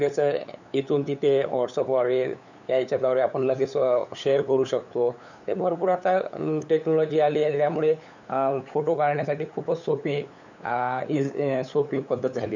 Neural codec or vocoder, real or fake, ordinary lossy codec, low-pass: codec, 16 kHz, 8 kbps, FunCodec, trained on LibriTTS, 25 frames a second; fake; none; 7.2 kHz